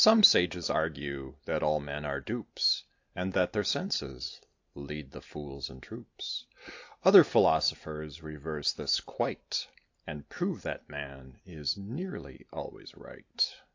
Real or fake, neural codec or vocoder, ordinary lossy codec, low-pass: real; none; AAC, 48 kbps; 7.2 kHz